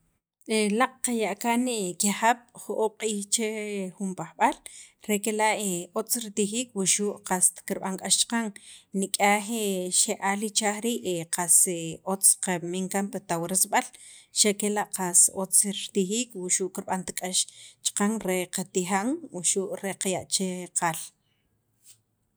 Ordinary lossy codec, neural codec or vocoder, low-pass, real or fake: none; none; none; real